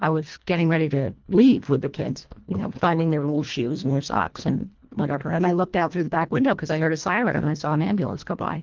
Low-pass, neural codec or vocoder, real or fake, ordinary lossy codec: 7.2 kHz; codec, 24 kHz, 1.5 kbps, HILCodec; fake; Opus, 32 kbps